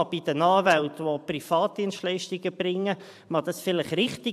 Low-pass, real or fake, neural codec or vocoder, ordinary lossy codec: 14.4 kHz; fake; vocoder, 44.1 kHz, 128 mel bands every 512 samples, BigVGAN v2; AAC, 96 kbps